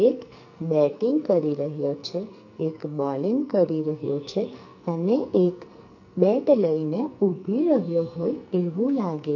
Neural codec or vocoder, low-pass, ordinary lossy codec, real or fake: codec, 44.1 kHz, 2.6 kbps, SNAC; 7.2 kHz; none; fake